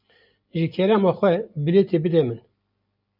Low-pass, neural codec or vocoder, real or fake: 5.4 kHz; none; real